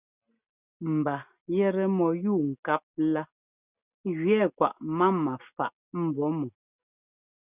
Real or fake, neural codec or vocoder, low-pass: real; none; 3.6 kHz